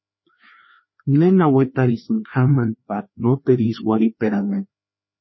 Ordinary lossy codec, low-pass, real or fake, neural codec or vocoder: MP3, 24 kbps; 7.2 kHz; fake; codec, 16 kHz, 2 kbps, FreqCodec, larger model